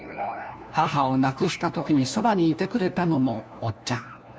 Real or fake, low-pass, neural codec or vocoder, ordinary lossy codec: fake; none; codec, 16 kHz, 1 kbps, FunCodec, trained on LibriTTS, 50 frames a second; none